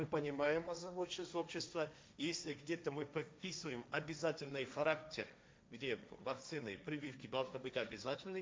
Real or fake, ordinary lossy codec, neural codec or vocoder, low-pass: fake; none; codec, 16 kHz, 1.1 kbps, Voila-Tokenizer; none